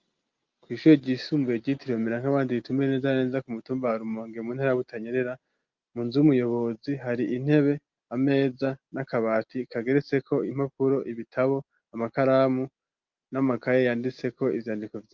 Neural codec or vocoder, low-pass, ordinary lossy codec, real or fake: none; 7.2 kHz; Opus, 24 kbps; real